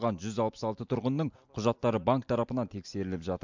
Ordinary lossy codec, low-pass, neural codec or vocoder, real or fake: MP3, 64 kbps; 7.2 kHz; none; real